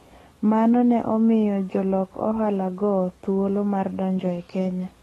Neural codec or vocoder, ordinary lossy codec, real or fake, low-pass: codec, 44.1 kHz, 7.8 kbps, DAC; AAC, 32 kbps; fake; 19.8 kHz